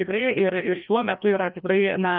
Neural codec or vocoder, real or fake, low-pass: codec, 16 kHz, 1 kbps, FreqCodec, larger model; fake; 5.4 kHz